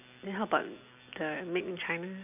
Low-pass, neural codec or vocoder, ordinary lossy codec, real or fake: 3.6 kHz; none; none; real